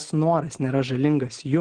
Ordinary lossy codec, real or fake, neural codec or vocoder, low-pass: Opus, 16 kbps; real; none; 9.9 kHz